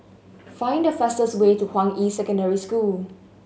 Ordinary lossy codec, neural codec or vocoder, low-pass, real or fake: none; none; none; real